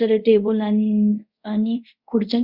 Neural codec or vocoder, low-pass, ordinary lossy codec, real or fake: codec, 24 kHz, 0.5 kbps, DualCodec; 5.4 kHz; Opus, 64 kbps; fake